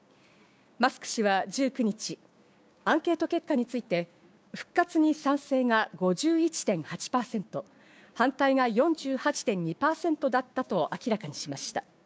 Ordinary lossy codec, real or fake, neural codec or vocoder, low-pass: none; fake; codec, 16 kHz, 6 kbps, DAC; none